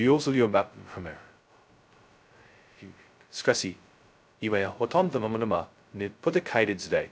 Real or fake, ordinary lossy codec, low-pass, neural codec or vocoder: fake; none; none; codec, 16 kHz, 0.2 kbps, FocalCodec